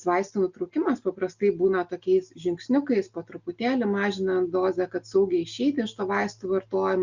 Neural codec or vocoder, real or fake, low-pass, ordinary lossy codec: none; real; 7.2 kHz; Opus, 64 kbps